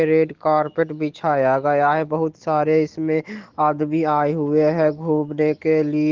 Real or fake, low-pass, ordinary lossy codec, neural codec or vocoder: real; 7.2 kHz; Opus, 16 kbps; none